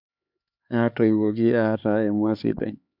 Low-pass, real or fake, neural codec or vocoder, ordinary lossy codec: 5.4 kHz; fake; codec, 16 kHz, 4 kbps, X-Codec, HuBERT features, trained on LibriSpeech; MP3, 48 kbps